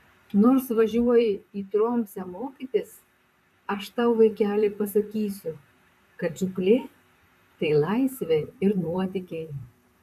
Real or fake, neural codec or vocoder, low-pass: fake; vocoder, 44.1 kHz, 128 mel bands, Pupu-Vocoder; 14.4 kHz